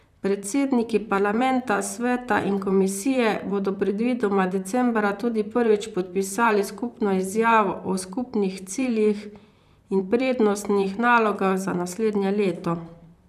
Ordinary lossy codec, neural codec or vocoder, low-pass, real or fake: none; vocoder, 44.1 kHz, 128 mel bands, Pupu-Vocoder; 14.4 kHz; fake